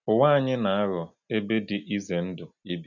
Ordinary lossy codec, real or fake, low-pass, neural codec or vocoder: none; real; 7.2 kHz; none